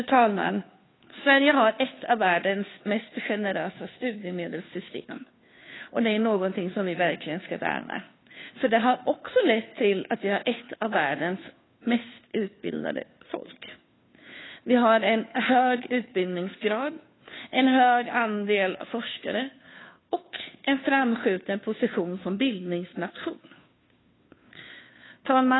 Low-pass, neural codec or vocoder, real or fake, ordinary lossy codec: 7.2 kHz; codec, 16 kHz, 2 kbps, FunCodec, trained on Chinese and English, 25 frames a second; fake; AAC, 16 kbps